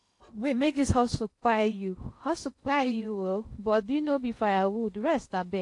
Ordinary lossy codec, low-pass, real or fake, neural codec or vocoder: AAC, 48 kbps; 10.8 kHz; fake; codec, 16 kHz in and 24 kHz out, 0.8 kbps, FocalCodec, streaming, 65536 codes